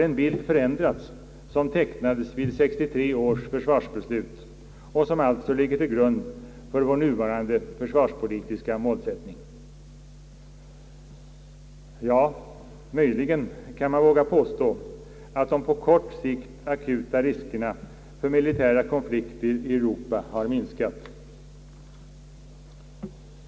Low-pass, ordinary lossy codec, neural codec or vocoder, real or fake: none; none; none; real